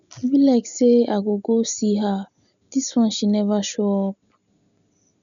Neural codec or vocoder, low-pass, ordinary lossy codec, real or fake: none; 7.2 kHz; none; real